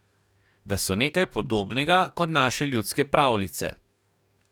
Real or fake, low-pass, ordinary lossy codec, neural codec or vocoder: fake; 19.8 kHz; none; codec, 44.1 kHz, 2.6 kbps, DAC